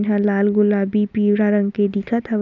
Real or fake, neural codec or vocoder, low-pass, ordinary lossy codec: real; none; 7.2 kHz; none